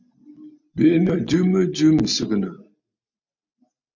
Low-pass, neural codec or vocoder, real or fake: 7.2 kHz; none; real